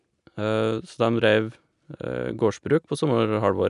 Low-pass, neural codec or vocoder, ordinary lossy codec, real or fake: 10.8 kHz; none; none; real